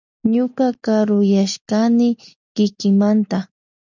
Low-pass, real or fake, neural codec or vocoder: 7.2 kHz; real; none